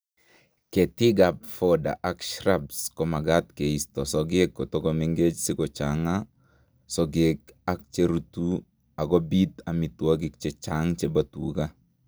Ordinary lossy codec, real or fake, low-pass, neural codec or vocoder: none; real; none; none